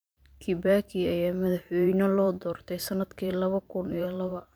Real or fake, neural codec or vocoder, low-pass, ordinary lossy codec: fake; vocoder, 44.1 kHz, 128 mel bands every 512 samples, BigVGAN v2; none; none